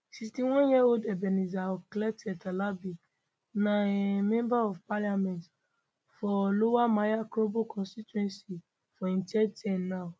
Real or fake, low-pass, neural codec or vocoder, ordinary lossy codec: real; none; none; none